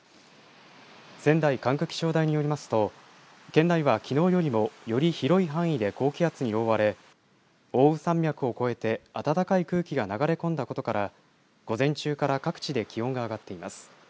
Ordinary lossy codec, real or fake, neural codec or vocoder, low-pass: none; real; none; none